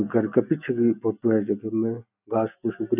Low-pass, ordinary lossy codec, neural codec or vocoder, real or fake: 3.6 kHz; none; none; real